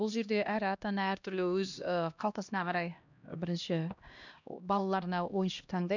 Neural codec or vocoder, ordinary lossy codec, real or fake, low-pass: codec, 16 kHz, 1 kbps, X-Codec, HuBERT features, trained on LibriSpeech; none; fake; 7.2 kHz